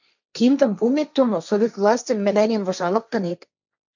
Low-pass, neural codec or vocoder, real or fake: 7.2 kHz; codec, 16 kHz, 1.1 kbps, Voila-Tokenizer; fake